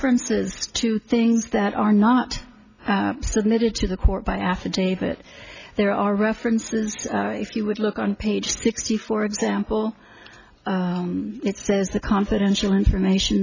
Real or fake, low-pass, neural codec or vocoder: real; 7.2 kHz; none